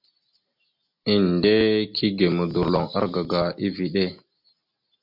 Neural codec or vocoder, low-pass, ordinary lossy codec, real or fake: none; 5.4 kHz; MP3, 48 kbps; real